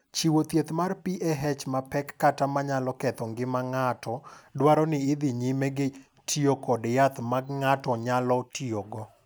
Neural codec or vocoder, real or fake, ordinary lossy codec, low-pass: none; real; none; none